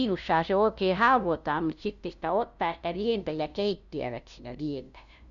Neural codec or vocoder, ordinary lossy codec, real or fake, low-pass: codec, 16 kHz, 0.5 kbps, FunCodec, trained on LibriTTS, 25 frames a second; none; fake; 7.2 kHz